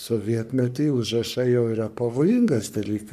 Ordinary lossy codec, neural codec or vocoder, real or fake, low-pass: AAC, 96 kbps; codec, 44.1 kHz, 3.4 kbps, Pupu-Codec; fake; 14.4 kHz